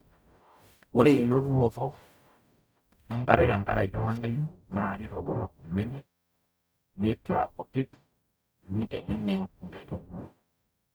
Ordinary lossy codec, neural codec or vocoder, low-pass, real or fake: none; codec, 44.1 kHz, 0.9 kbps, DAC; none; fake